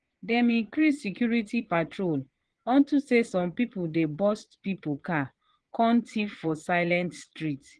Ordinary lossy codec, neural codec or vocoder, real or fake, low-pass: Opus, 16 kbps; vocoder, 22.05 kHz, 80 mel bands, Vocos; fake; 9.9 kHz